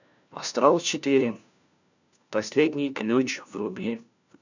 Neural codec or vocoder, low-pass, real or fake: codec, 16 kHz, 1 kbps, FunCodec, trained on LibriTTS, 50 frames a second; 7.2 kHz; fake